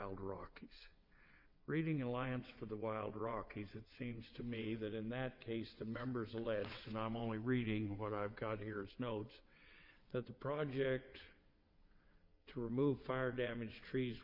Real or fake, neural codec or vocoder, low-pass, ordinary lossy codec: fake; vocoder, 22.05 kHz, 80 mel bands, WaveNeXt; 5.4 kHz; MP3, 48 kbps